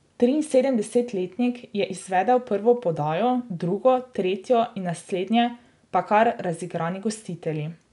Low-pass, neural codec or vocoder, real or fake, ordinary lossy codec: 10.8 kHz; none; real; none